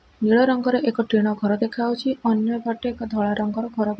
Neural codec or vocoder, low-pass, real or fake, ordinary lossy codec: none; none; real; none